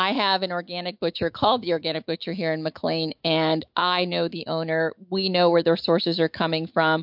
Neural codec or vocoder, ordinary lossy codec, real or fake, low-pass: autoencoder, 48 kHz, 128 numbers a frame, DAC-VAE, trained on Japanese speech; MP3, 48 kbps; fake; 5.4 kHz